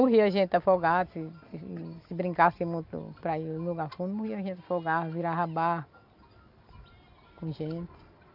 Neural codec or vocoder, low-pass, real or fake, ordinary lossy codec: none; 5.4 kHz; real; none